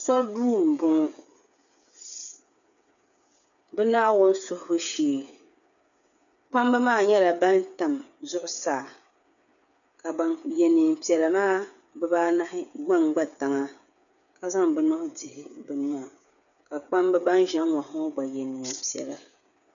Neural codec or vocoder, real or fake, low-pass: codec, 16 kHz, 8 kbps, FreqCodec, smaller model; fake; 7.2 kHz